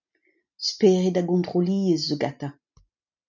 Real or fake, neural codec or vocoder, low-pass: real; none; 7.2 kHz